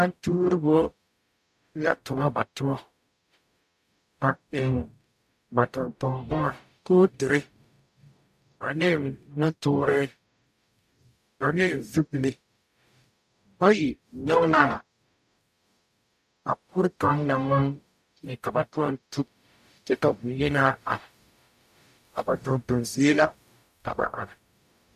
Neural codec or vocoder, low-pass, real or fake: codec, 44.1 kHz, 0.9 kbps, DAC; 14.4 kHz; fake